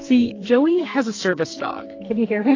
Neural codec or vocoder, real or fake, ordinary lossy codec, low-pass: codec, 44.1 kHz, 2.6 kbps, SNAC; fake; AAC, 32 kbps; 7.2 kHz